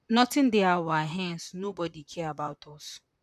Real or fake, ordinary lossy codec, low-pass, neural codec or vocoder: fake; none; 14.4 kHz; vocoder, 44.1 kHz, 128 mel bands, Pupu-Vocoder